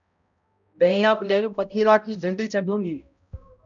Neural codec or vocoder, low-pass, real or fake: codec, 16 kHz, 0.5 kbps, X-Codec, HuBERT features, trained on balanced general audio; 7.2 kHz; fake